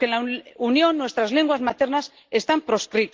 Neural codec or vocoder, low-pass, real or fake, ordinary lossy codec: none; 7.2 kHz; real; Opus, 16 kbps